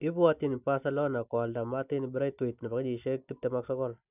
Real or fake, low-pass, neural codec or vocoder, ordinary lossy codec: real; 3.6 kHz; none; none